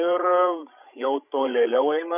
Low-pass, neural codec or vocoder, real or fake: 3.6 kHz; codec, 16 kHz, 16 kbps, FreqCodec, larger model; fake